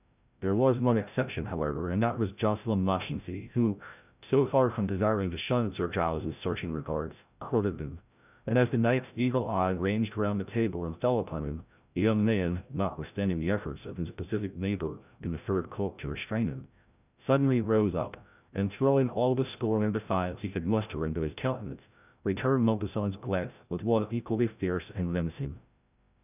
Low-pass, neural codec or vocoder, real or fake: 3.6 kHz; codec, 16 kHz, 0.5 kbps, FreqCodec, larger model; fake